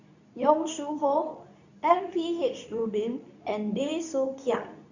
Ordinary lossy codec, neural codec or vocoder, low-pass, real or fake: none; codec, 24 kHz, 0.9 kbps, WavTokenizer, medium speech release version 2; 7.2 kHz; fake